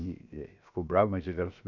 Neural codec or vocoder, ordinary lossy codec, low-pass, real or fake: codec, 16 kHz, 0.7 kbps, FocalCodec; none; 7.2 kHz; fake